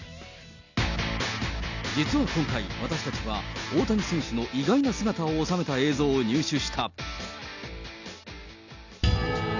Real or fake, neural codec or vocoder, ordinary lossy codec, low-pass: real; none; none; 7.2 kHz